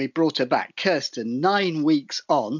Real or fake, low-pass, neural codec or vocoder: real; 7.2 kHz; none